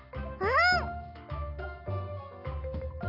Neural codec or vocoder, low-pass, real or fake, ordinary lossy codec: none; 5.4 kHz; real; none